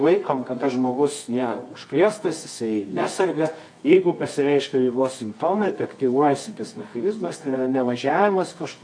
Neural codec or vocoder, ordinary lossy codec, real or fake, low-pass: codec, 24 kHz, 0.9 kbps, WavTokenizer, medium music audio release; MP3, 48 kbps; fake; 9.9 kHz